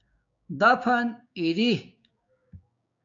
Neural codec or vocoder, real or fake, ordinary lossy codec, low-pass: codec, 16 kHz, 6 kbps, DAC; fake; MP3, 64 kbps; 7.2 kHz